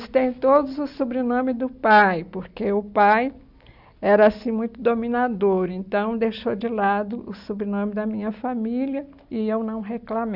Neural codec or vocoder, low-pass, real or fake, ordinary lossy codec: none; 5.4 kHz; real; none